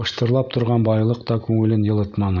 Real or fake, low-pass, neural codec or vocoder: real; 7.2 kHz; none